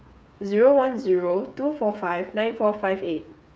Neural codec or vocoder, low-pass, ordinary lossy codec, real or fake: codec, 16 kHz, 8 kbps, FreqCodec, smaller model; none; none; fake